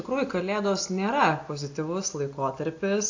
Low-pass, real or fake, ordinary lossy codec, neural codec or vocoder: 7.2 kHz; real; AAC, 48 kbps; none